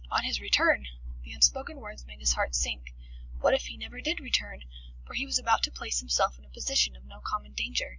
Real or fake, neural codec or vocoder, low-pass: real; none; 7.2 kHz